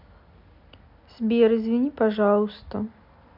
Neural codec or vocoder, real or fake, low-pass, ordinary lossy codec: none; real; 5.4 kHz; none